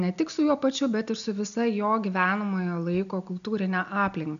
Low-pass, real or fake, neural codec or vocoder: 7.2 kHz; real; none